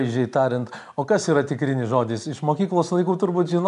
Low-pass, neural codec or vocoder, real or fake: 10.8 kHz; none; real